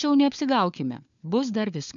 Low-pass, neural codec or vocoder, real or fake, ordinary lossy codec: 7.2 kHz; codec, 16 kHz, 4 kbps, FunCodec, trained on LibriTTS, 50 frames a second; fake; MP3, 96 kbps